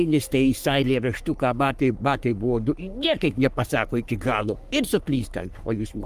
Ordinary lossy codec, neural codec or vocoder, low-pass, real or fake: Opus, 32 kbps; codec, 44.1 kHz, 3.4 kbps, Pupu-Codec; 14.4 kHz; fake